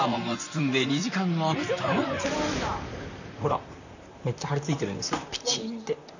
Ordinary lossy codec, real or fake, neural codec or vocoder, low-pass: none; fake; vocoder, 44.1 kHz, 128 mel bands, Pupu-Vocoder; 7.2 kHz